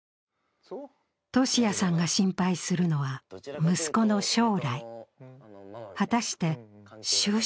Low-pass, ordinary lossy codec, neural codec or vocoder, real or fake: none; none; none; real